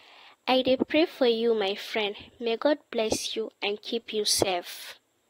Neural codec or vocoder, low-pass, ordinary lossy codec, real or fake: vocoder, 44.1 kHz, 128 mel bands every 512 samples, BigVGAN v2; 19.8 kHz; AAC, 48 kbps; fake